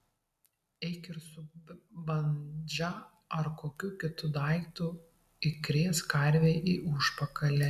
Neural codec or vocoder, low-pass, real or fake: none; 14.4 kHz; real